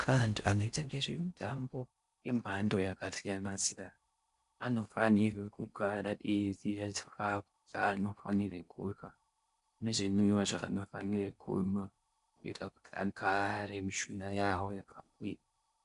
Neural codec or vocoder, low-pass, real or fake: codec, 16 kHz in and 24 kHz out, 0.6 kbps, FocalCodec, streaming, 4096 codes; 10.8 kHz; fake